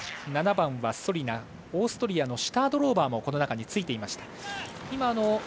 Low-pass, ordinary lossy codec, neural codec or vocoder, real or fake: none; none; none; real